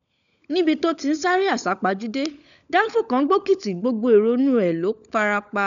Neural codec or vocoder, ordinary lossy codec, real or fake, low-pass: codec, 16 kHz, 16 kbps, FunCodec, trained on LibriTTS, 50 frames a second; none; fake; 7.2 kHz